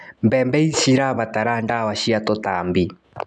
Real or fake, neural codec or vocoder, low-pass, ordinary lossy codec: real; none; none; none